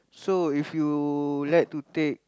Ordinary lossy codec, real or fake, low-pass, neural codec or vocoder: none; real; none; none